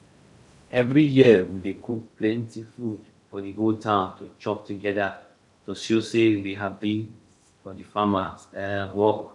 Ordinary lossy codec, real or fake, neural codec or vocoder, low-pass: none; fake; codec, 16 kHz in and 24 kHz out, 0.6 kbps, FocalCodec, streaming, 2048 codes; 10.8 kHz